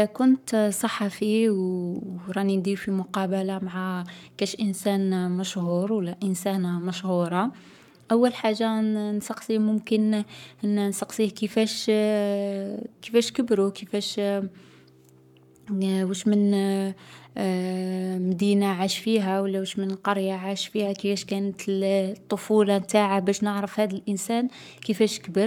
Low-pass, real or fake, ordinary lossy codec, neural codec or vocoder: 19.8 kHz; fake; none; codec, 44.1 kHz, 7.8 kbps, Pupu-Codec